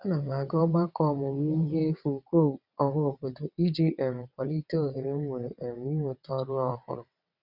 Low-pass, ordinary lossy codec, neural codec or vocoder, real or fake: 5.4 kHz; none; vocoder, 22.05 kHz, 80 mel bands, WaveNeXt; fake